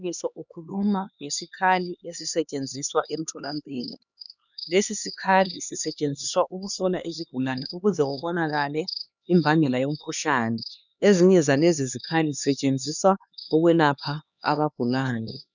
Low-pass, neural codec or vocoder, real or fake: 7.2 kHz; codec, 16 kHz, 2 kbps, X-Codec, HuBERT features, trained on LibriSpeech; fake